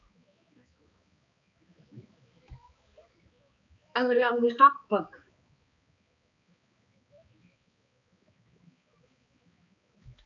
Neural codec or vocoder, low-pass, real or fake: codec, 16 kHz, 2 kbps, X-Codec, HuBERT features, trained on balanced general audio; 7.2 kHz; fake